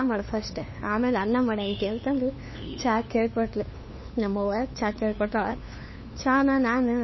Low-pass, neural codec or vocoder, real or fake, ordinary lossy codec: 7.2 kHz; codec, 16 kHz, 2 kbps, FunCodec, trained on LibriTTS, 25 frames a second; fake; MP3, 24 kbps